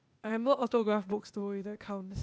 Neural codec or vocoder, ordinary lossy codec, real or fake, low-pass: codec, 16 kHz, 0.8 kbps, ZipCodec; none; fake; none